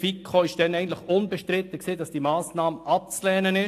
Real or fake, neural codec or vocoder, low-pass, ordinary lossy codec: real; none; 14.4 kHz; AAC, 64 kbps